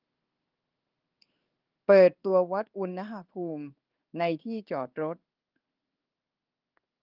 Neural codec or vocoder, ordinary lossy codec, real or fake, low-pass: codec, 16 kHz in and 24 kHz out, 1 kbps, XY-Tokenizer; Opus, 24 kbps; fake; 5.4 kHz